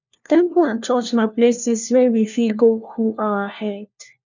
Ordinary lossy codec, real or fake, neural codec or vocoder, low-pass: none; fake; codec, 16 kHz, 1 kbps, FunCodec, trained on LibriTTS, 50 frames a second; 7.2 kHz